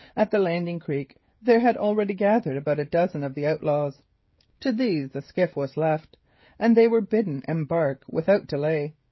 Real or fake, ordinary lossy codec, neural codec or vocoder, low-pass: fake; MP3, 24 kbps; codec, 16 kHz, 16 kbps, FreqCodec, smaller model; 7.2 kHz